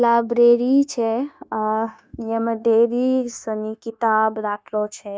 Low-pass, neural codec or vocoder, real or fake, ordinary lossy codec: none; codec, 16 kHz, 0.9 kbps, LongCat-Audio-Codec; fake; none